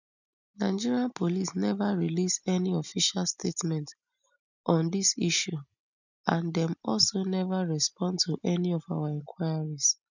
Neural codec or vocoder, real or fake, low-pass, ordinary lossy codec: none; real; 7.2 kHz; none